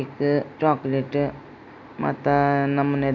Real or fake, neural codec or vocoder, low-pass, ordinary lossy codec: real; none; 7.2 kHz; none